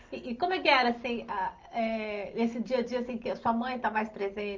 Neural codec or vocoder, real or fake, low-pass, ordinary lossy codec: none; real; 7.2 kHz; Opus, 32 kbps